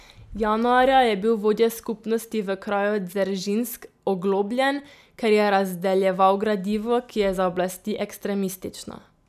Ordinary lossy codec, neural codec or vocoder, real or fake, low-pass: none; none; real; 14.4 kHz